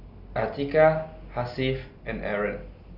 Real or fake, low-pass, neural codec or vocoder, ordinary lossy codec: real; 5.4 kHz; none; none